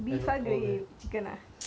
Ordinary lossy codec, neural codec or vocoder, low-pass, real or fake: none; none; none; real